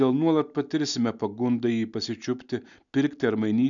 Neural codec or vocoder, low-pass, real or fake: none; 7.2 kHz; real